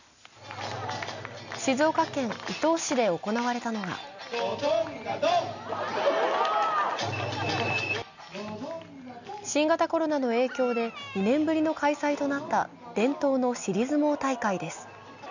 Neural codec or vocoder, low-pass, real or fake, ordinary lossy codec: none; 7.2 kHz; real; none